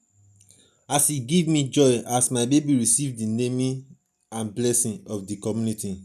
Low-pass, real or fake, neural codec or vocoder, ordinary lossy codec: 14.4 kHz; real; none; none